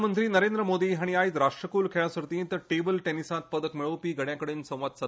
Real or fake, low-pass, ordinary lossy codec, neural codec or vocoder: real; none; none; none